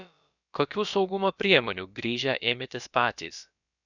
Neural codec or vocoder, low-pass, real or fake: codec, 16 kHz, about 1 kbps, DyCAST, with the encoder's durations; 7.2 kHz; fake